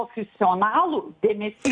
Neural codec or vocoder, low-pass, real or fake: none; 9.9 kHz; real